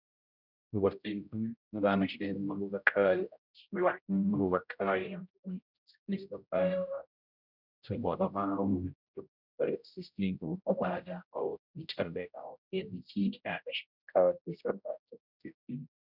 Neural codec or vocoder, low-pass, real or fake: codec, 16 kHz, 0.5 kbps, X-Codec, HuBERT features, trained on general audio; 5.4 kHz; fake